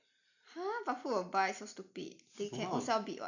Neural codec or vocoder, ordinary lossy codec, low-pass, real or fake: none; none; 7.2 kHz; real